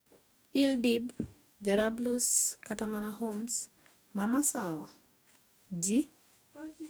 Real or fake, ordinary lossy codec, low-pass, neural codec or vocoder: fake; none; none; codec, 44.1 kHz, 2.6 kbps, DAC